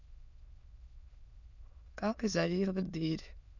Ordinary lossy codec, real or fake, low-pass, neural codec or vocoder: none; fake; 7.2 kHz; autoencoder, 22.05 kHz, a latent of 192 numbers a frame, VITS, trained on many speakers